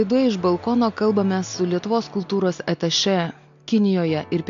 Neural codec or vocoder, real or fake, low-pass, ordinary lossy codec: none; real; 7.2 kHz; AAC, 64 kbps